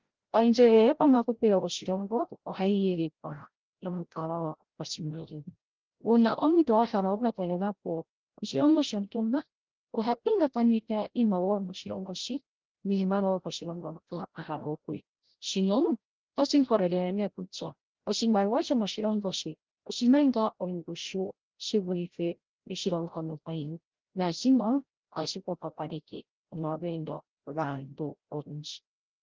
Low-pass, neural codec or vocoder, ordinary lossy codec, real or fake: 7.2 kHz; codec, 16 kHz, 0.5 kbps, FreqCodec, larger model; Opus, 16 kbps; fake